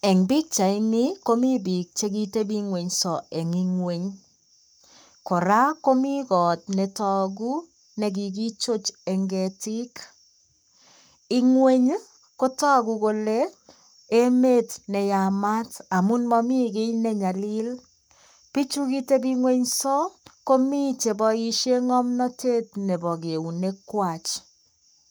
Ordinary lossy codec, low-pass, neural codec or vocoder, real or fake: none; none; codec, 44.1 kHz, 7.8 kbps, Pupu-Codec; fake